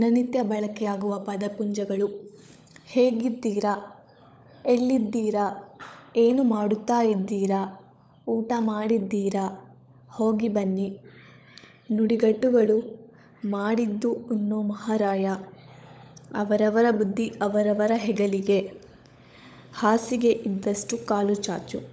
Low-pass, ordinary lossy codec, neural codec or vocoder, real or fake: none; none; codec, 16 kHz, 16 kbps, FunCodec, trained on LibriTTS, 50 frames a second; fake